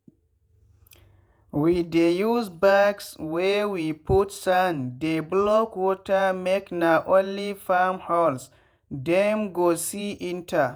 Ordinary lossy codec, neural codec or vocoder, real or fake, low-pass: none; vocoder, 48 kHz, 128 mel bands, Vocos; fake; none